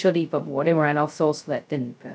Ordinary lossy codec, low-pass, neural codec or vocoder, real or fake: none; none; codec, 16 kHz, 0.2 kbps, FocalCodec; fake